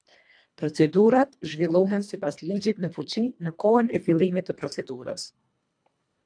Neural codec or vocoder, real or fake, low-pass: codec, 24 kHz, 1.5 kbps, HILCodec; fake; 9.9 kHz